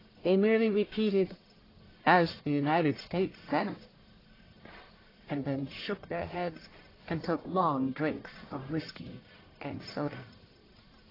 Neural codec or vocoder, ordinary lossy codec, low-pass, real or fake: codec, 44.1 kHz, 1.7 kbps, Pupu-Codec; AAC, 24 kbps; 5.4 kHz; fake